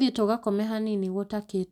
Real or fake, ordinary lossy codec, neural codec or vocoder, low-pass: real; none; none; 19.8 kHz